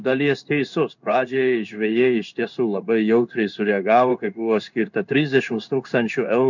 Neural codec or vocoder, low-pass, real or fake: codec, 16 kHz in and 24 kHz out, 1 kbps, XY-Tokenizer; 7.2 kHz; fake